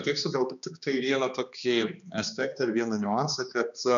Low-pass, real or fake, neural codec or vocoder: 7.2 kHz; fake; codec, 16 kHz, 2 kbps, X-Codec, HuBERT features, trained on balanced general audio